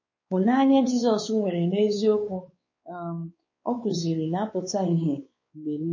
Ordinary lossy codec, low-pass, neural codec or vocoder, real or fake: MP3, 32 kbps; 7.2 kHz; codec, 16 kHz, 4 kbps, X-Codec, WavLM features, trained on Multilingual LibriSpeech; fake